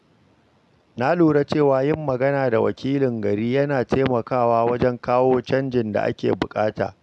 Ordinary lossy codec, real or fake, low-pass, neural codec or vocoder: none; real; none; none